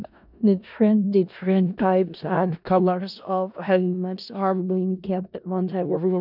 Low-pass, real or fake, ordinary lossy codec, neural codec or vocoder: 5.4 kHz; fake; none; codec, 16 kHz in and 24 kHz out, 0.4 kbps, LongCat-Audio-Codec, four codebook decoder